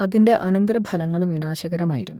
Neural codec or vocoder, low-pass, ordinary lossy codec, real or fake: codec, 44.1 kHz, 2.6 kbps, DAC; 19.8 kHz; none; fake